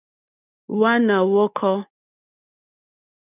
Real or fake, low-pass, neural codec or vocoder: real; 3.6 kHz; none